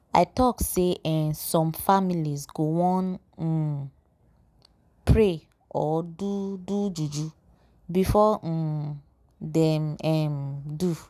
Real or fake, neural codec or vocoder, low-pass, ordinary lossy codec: real; none; 14.4 kHz; none